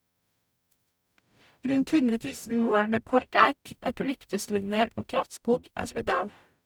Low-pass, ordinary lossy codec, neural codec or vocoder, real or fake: none; none; codec, 44.1 kHz, 0.9 kbps, DAC; fake